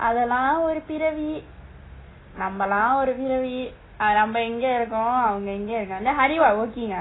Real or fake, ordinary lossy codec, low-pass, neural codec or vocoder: real; AAC, 16 kbps; 7.2 kHz; none